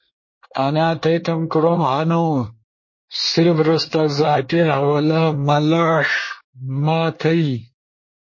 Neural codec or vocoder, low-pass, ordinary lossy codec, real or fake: codec, 24 kHz, 1 kbps, SNAC; 7.2 kHz; MP3, 32 kbps; fake